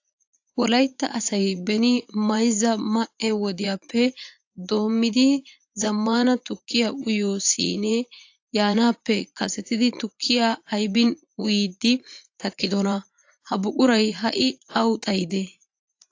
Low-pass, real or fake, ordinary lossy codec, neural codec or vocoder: 7.2 kHz; fake; AAC, 48 kbps; vocoder, 44.1 kHz, 80 mel bands, Vocos